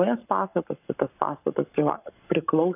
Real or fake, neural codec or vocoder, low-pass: fake; codec, 44.1 kHz, 7.8 kbps, Pupu-Codec; 3.6 kHz